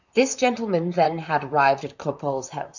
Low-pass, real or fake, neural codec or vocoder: 7.2 kHz; fake; vocoder, 44.1 kHz, 128 mel bands, Pupu-Vocoder